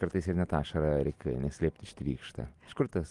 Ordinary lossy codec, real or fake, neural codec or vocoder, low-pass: Opus, 24 kbps; real; none; 10.8 kHz